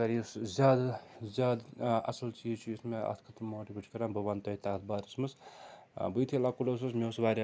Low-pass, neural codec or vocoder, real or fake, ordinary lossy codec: none; none; real; none